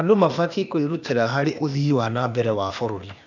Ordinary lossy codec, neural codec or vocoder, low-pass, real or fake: none; codec, 16 kHz, 0.8 kbps, ZipCodec; 7.2 kHz; fake